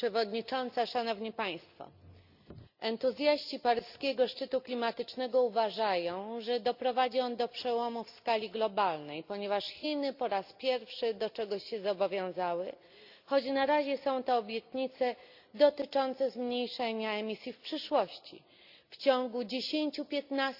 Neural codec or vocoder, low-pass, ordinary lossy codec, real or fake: none; 5.4 kHz; Opus, 64 kbps; real